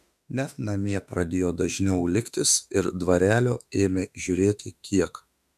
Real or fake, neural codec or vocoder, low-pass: fake; autoencoder, 48 kHz, 32 numbers a frame, DAC-VAE, trained on Japanese speech; 14.4 kHz